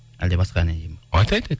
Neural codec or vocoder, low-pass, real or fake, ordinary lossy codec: none; none; real; none